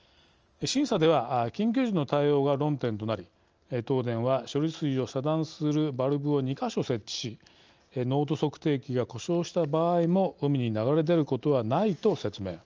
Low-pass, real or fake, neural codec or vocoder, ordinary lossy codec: 7.2 kHz; real; none; Opus, 24 kbps